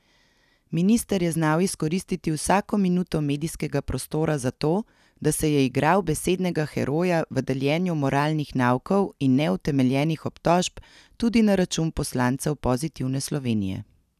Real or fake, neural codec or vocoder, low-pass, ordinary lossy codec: real; none; 14.4 kHz; none